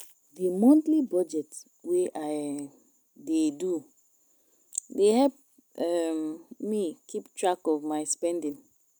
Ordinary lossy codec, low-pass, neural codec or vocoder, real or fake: none; none; none; real